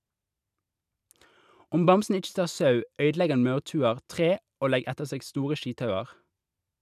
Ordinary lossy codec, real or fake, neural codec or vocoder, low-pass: none; real; none; 14.4 kHz